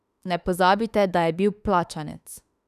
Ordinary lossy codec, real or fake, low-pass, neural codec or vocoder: none; fake; 14.4 kHz; autoencoder, 48 kHz, 32 numbers a frame, DAC-VAE, trained on Japanese speech